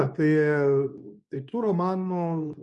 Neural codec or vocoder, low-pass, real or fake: codec, 24 kHz, 0.9 kbps, WavTokenizer, medium speech release version 2; 10.8 kHz; fake